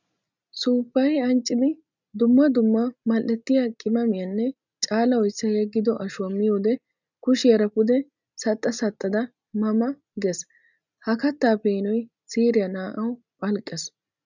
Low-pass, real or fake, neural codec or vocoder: 7.2 kHz; real; none